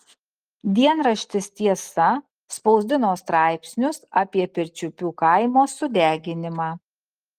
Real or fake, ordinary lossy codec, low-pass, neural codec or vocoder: real; Opus, 24 kbps; 14.4 kHz; none